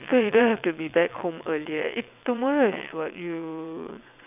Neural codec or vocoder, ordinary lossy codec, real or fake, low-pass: vocoder, 22.05 kHz, 80 mel bands, WaveNeXt; none; fake; 3.6 kHz